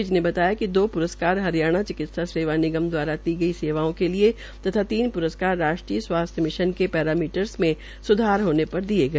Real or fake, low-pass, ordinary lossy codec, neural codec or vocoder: real; none; none; none